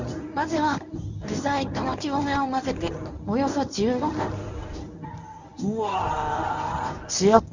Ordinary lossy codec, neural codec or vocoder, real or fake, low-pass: none; codec, 24 kHz, 0.9 kbps, WavTokenizer, medium speech release version 1; fake; 7.2 kHz